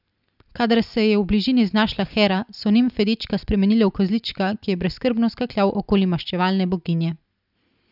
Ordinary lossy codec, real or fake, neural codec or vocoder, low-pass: none; real; none; 5.4 kHz